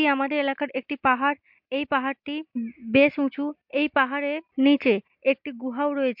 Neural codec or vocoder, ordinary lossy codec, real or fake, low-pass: none; MP3, 48 kbps; real; 5.4 kHz